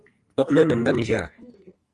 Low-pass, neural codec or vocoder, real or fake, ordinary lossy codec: 10.8 kHz; codec, 44.1 kHz, 2.6 kbps, SNAC; fake; Opus, 32 kbps